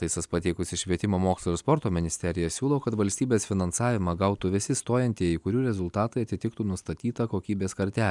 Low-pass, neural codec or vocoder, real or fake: 10.8 kHz; none; real